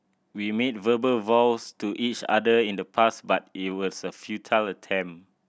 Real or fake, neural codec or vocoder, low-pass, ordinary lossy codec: real; none; none; none